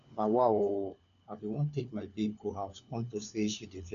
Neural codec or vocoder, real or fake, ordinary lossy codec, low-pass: codec, 16 kHz, 4 kbps, FunCodec, trained on LibriTTS, 50 frames a second; fake; none; 7.2 kHz